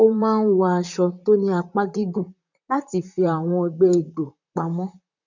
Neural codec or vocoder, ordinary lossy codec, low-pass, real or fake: vocoder, 44.1 kHz, 128 mel bands, Pupu-Vocoder; none; 7.2 kHz; fake